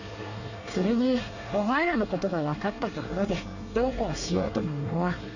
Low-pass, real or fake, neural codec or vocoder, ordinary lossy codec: 7.2 kHz; fake; codec, 24 kHz, 1 kbps, SNAC; none